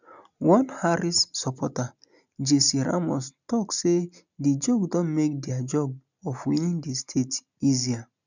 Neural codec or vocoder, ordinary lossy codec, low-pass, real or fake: none; none; 7.2 kHz; real